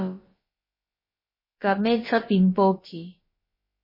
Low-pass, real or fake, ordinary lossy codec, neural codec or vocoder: 5.4 kHz; fake; MP3, 24 kbps; codec, 16 kHz, about 1 kbps, DyCAST, with the encoder's durations